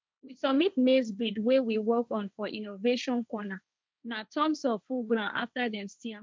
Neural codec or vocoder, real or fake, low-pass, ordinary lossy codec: codec, 16 kHz, 1.1 kbps, Voila-Tokenizer; fake; none; none